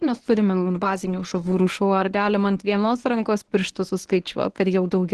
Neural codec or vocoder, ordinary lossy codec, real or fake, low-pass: codec, 24 kHz, 0.9 kbps, WavTokenizer, medium speech release version 1; Opus, 16 kbps; fake; 10.8 kHz